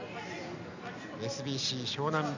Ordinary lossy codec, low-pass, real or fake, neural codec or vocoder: none; 7.2 kHz; real; none